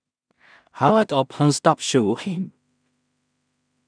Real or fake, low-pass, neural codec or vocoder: fake; 9.9 kHz; codec, 16 kHz in and 24 kHz out, 0.4 kbps, LongCat-Audio-Codec, two codebook decoder